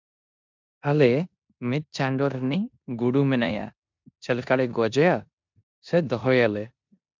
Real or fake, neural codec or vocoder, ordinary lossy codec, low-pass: fake; codec, 16 kHz in and 24 kHz out, 0.9 kbps, LongCat-Audio-Codec, four codebook decoder; MP3, 64 kbps; 7.2 kHz